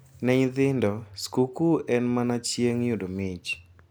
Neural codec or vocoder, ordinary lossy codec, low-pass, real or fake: none; none; none; real